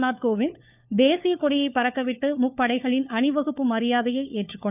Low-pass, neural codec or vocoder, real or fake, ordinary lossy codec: 3.6 kHz; codec, 16 kHz, 4 kbps, FunCodec, trained on LibriTTS, 50 frames a second; fake; none